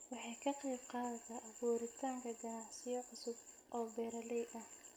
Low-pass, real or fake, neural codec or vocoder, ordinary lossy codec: none; real; none; none